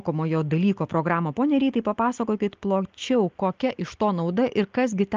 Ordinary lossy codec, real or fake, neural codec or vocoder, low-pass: Opus, 24 kbps; real; none; 7.2 kHz